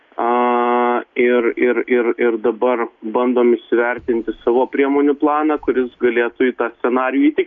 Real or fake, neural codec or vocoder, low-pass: real; none; 7.2 kHz